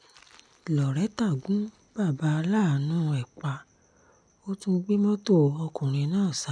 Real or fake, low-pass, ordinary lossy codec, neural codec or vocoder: real; 9.9 kHz; none; none